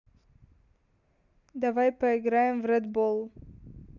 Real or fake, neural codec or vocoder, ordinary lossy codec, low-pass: real; none; none; 7.2 kHz